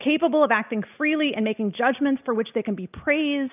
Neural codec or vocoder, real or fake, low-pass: none; real; 3.6 kHz